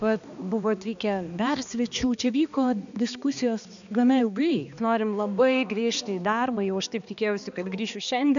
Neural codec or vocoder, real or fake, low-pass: codec, 16 kHz, 2 kbps, X-Codec, HuBERT features, trained on balanced general audio; fake; 7.2 kHz